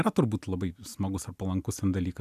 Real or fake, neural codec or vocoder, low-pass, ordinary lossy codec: real; none; 14.4 kHz; AAC, 96 kbps